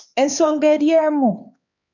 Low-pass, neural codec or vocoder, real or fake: 7.2 kHz; codec, 16 kHz, 4 kbps, X-Codec, HuBERT features, trained on LibriSpeech; fake